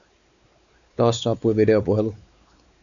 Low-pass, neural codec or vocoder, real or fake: 7.2 kHz; codec, 16 kHz, 4 kbps, X-Codec, WavLM features, trained on Multilingual LibriSpeech; fake